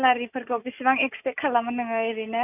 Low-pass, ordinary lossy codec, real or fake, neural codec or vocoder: 3.6 kHz; none; real; none